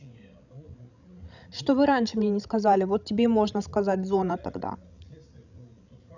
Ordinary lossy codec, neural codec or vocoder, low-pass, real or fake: none; codec, 16 kHz, 16 kbps, FreqCodec, larger model; 7.2 kHz; fake